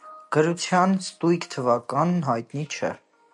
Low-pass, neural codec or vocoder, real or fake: 10.8 kHz; none; real